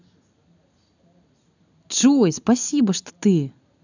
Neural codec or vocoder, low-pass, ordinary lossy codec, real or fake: none; 7.2 kHz; none; real